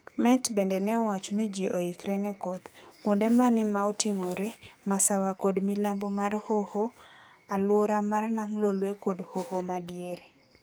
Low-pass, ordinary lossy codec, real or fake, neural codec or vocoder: none; none; fake; codec, 44.1 kHz, 2.6 kbps, SNAC